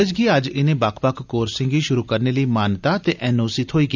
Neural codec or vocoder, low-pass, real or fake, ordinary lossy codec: none; 7.2 kHz; real; none